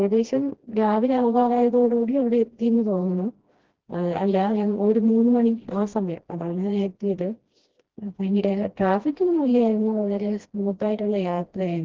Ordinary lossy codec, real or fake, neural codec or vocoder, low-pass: Opus, 16 kbps; fake; codec, 16 kHz, 1 kbps, FreqCodec, smaller model; 7.2 kHz